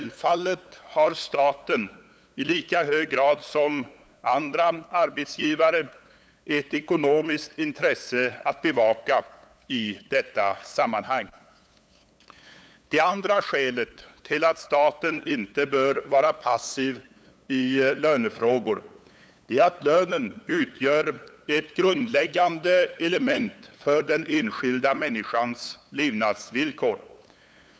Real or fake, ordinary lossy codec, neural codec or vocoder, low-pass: fake; none; codec, 16 kHz, 8 kbps, FunCodec, trained on LibriTTS, 25 frames a second; none